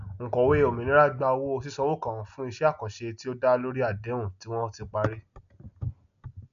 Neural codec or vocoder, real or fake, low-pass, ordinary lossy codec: none; real; 7.2 kHz; none